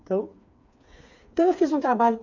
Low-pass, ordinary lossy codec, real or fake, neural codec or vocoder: 7.2 kHz; MP3, 48 kbps; fake; codec, 16 kHz, 4 kbps, FreqCodec, smaller model